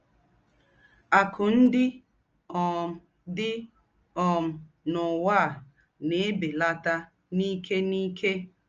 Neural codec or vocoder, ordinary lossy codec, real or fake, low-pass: none; Opus, 24 kbps; real; 7.2 kHz